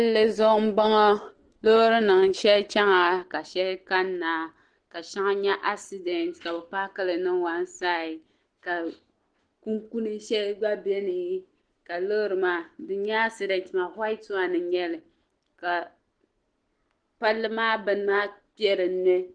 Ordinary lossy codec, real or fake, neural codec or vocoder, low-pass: Opus, 16 kbps; real; none; 9.9 kHz